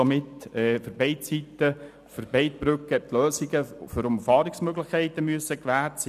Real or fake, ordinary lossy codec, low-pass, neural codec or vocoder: real; none; 14.4 kHz; none